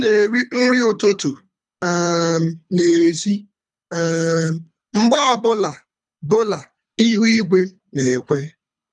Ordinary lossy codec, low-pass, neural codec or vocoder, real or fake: none; 10.8 kHz; codec, 24 kHz, 3 kbps, HILCodec; fake